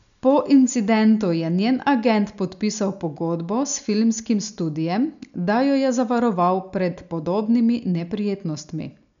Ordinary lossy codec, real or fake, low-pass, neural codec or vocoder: none; real; 7.2 kHz; none